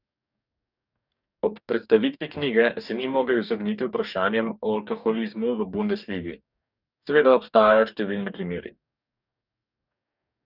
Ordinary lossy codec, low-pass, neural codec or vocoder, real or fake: none; 5.4 kHz; codec, 44.1 kHz, 2.6 kbps, DAC; fake